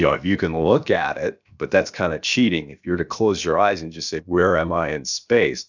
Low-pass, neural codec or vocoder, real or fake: 7.2 kHz; codec, 16 kHz, about 1 kbps, DyCAST, with the encoder's durations; fake